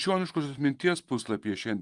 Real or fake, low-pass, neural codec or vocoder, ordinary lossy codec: fake; 10.8 kHz; vocoder, 24 kHz, 100 mel bands, Vocos; Opus, 32 kbps